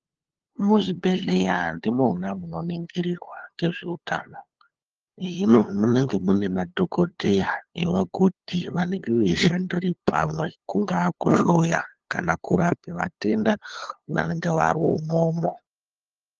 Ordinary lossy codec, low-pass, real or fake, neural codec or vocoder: Opus, 16 kbps; 7.2 kHz; fake; codec, 16 kHz, 2 kbps, FunCodec, trained on LibriTTS, 25 frames a second